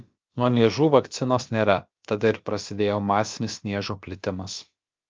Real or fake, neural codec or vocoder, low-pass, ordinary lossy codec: fake; codec, 16 kHz, about 1 kbps, DyCAST, with the encoder's durations; 7.2 kHz; Opus, 24 kbps